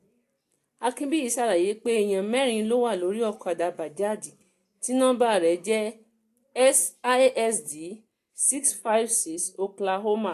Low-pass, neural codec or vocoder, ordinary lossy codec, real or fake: 14.4 kHz; none; AAC, 64 kbps; real